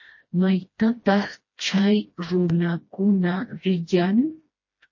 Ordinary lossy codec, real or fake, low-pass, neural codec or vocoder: MP3, 32 kbps; fake; 7.2 kHz; codec, 16 kHz, 1 kbps, FreqCodec, smaller model